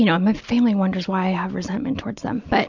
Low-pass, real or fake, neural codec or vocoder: 7.2 kHz; real; none